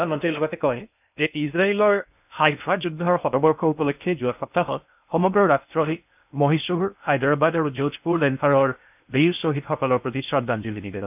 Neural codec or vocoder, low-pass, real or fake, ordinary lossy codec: codec, 16 kHz in and 24 kHz out, 0.6 kbps, FocalCodec, streaming, 2048 codes; 3.6 kHz; fake; none